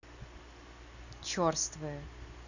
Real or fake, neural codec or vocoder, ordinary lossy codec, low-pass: real; none; none; 7.2 kHz